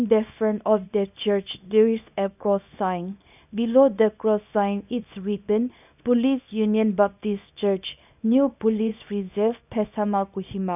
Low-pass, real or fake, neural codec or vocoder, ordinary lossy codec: 3.6 kHz; fake; codec, 24 kHz, 0.9 kbps, WavTokenizer, small release; none